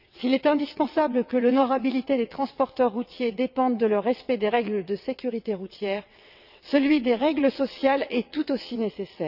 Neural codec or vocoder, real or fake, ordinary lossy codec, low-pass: vocoder, 22.05 kHz, 80 mel bands, WaveNeXt; fake; none; 5.4 kHz